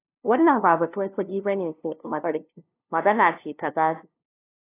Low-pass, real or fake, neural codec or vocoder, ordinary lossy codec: 3.6 kHz; fake; codec, 16 kHz, 0.5 kbps, FunCodec, trained on LibriTTS, 25 frames a second; AAC, 24 kbps